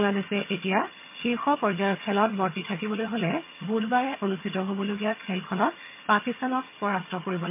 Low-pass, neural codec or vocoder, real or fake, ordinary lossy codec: 3.6 kHz; vocoder, 22.05 kHz, 80 mel bands, HiFi-GAN; fake; MP3, 24 kbps